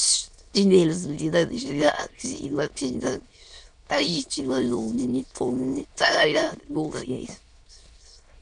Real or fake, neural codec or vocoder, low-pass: fake; autoencoder, 22.05 kHz, a latent of 192 numbers a frame, VITS, trained on many speakers; 9.9 kHz